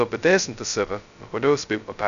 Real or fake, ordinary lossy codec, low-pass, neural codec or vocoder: fake; MP3, 96 kbps; 7.2 kHz; codec, 16 kHz, 0.2 kbps, FocalCodec